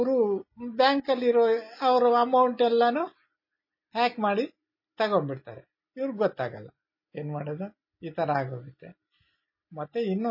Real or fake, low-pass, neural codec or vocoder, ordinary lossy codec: real; 5.4 kHz; none; MP3, 24 kbps